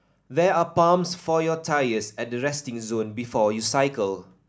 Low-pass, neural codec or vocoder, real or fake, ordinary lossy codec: none; none; real; none